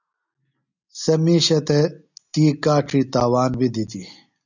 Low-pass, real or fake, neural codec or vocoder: 7.2 kHz; real; none